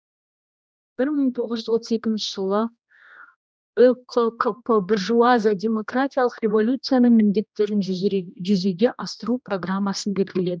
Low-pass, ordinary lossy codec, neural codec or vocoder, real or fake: none; none; codec, 16 kHz, 1 kbps, X-Codec, HuBERT features, trained on general audio; fake